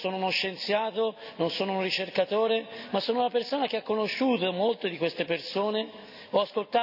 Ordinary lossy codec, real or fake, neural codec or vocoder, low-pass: none; real; none; 5.4 kHz